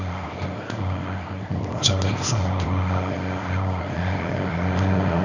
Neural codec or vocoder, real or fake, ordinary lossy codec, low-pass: codec, 24 kHz, 0.9 kbps, WavTokenizer, small release; fake; none; 7.2 kHz